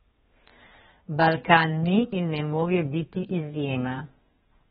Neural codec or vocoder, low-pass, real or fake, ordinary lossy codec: codec, 32 kHz, 1.9 kbps, SNAC; 14.4 kHz; fake; AAC, 16 kbps